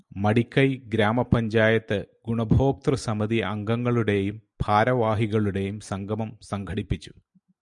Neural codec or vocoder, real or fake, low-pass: none; real; 10.8 kHz